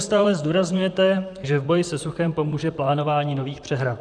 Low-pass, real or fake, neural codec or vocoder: 9.9 kHz; fake; vocoder, 44.1 kHz, 128 mel bands, Pupu-Vocoder